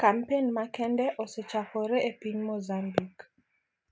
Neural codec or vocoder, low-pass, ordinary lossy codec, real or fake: none; none; none; real